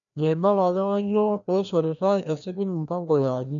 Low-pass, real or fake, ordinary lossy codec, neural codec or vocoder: 7.2 kHz; fake; none; codec, 16 kHz, 1 kbps, FreqCodec, larger model